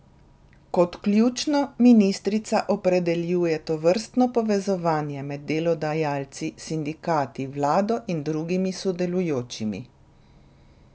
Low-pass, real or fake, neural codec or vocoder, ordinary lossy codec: none; real; none; none